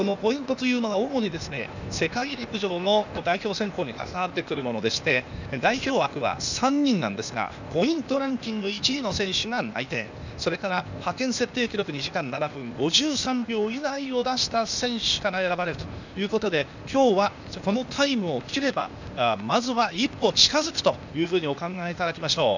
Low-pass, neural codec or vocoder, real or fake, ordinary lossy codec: 7.2 kHz; codec, 16 kHz, 0.8 kbps, ZipCodec; fake; none